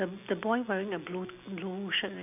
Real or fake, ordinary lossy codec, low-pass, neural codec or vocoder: real; none; 3.6 kHz; none